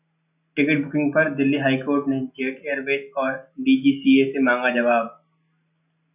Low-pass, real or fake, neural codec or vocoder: 3.6 kHz; real; none